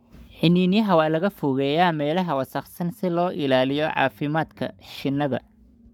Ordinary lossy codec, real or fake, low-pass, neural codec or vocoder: none; fake; 19.8 kHz; codec, 44.1 kHz, 7.8 kbps, Pupu-Codec